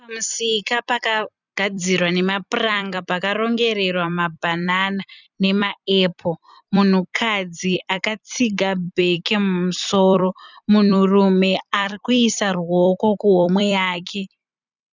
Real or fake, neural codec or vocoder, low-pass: fake; vocoder, 44.1 kHz, 128 mel bands every 256 samples, BigVGAN v2; 7.2 kHz